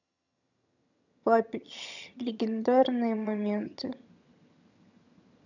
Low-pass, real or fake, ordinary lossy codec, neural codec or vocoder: 7.2 kHz; fake; none; vocoder, 22.05 kHz, 80 mel bands, HiFi-GAN